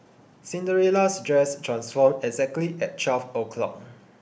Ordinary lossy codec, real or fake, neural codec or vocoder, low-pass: none; real; none; none